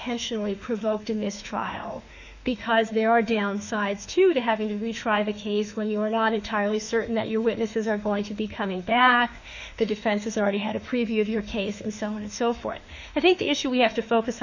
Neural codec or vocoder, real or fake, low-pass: autoencoder, 48 kHz, 32 numbers a frame, DAC-VAE, trained on Japanese speech; fake; 7.2 kHz